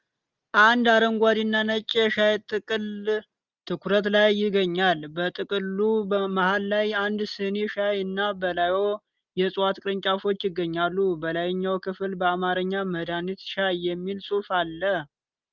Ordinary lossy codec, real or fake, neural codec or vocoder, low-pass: Opus, 32 kbps; real; none; 7.2 kHz